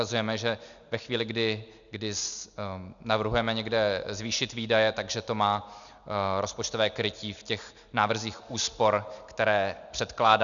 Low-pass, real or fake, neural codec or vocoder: 7.2 kHz; real; none